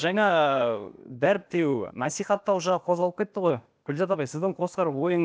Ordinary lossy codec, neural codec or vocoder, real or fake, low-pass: none; codec, 16 kHz, 0.8 kbps, ZipCodec; fake; none